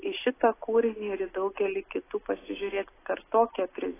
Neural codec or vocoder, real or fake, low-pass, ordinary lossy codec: none; real; 3.6 kHz; AAC, 16 kbps